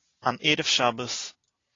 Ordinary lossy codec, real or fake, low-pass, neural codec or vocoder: AAC, 32 kbps; real; 7.2 kHz; none